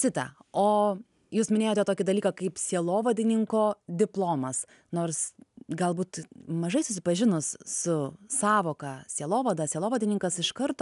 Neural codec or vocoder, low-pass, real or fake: none; 10.8 kHz; real